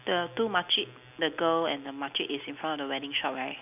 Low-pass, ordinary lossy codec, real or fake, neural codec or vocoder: 3.6 kHz; none; real; none